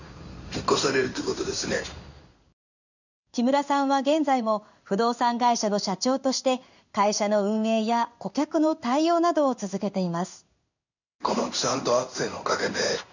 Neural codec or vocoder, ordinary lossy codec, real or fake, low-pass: codec, 16 kHz in and 24 kHz out, 1 kbps, XY-Tokenizer; none; fake; 7.2 kHz